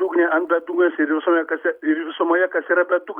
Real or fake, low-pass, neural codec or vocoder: real; 19.8 kHz; none